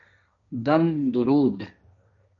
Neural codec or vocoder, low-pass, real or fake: codec, 16 kHz, 1.1 kbps, Voila-Tokenizer; 7.2 kHz; fake